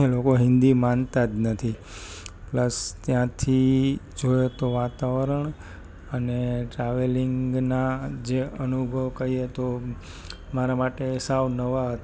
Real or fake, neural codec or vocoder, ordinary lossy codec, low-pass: real; none; none; none